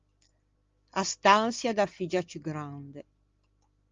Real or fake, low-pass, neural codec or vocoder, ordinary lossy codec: real; 7.2 kHz; none; Opus, 32 kbps